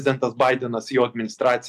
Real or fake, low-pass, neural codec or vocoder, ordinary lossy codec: real; 14.4 kHz; none; MP3, 96 kbps